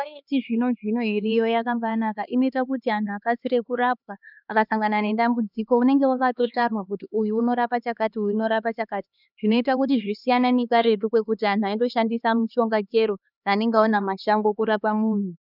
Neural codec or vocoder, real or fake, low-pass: codec, 16 kHz, 2 kbps, X-Codec, HuBERT features, trained on LibriSpeech; fake; 5.4 kHz